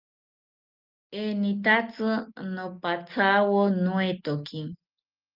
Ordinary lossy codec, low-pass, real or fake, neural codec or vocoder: Opus, 24 kbps; 5.4 kHz; real; none